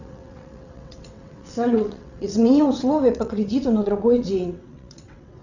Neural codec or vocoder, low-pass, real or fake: vocoder, 22.05 kHz, 80 mel bands, WaveNeXt; 7.2 kHz; fake